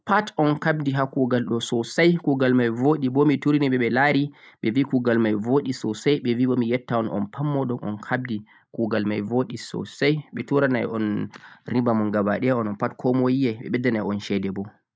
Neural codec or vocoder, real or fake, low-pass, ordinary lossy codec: none; real; none; none